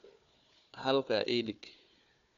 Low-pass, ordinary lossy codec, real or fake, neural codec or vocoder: 7.2 kHz; none; fake; codec, 16 kHz, 4 kbps, FunCodec, trained on Chinese and English, 50 frames a second